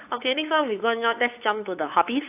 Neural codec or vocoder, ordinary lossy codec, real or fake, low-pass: codec, 16 kHz, 8 kbps, FunCodec, trained on LibriTTS, 25 frames a second; AAC, 32 kbps; fake; 3.6 kHz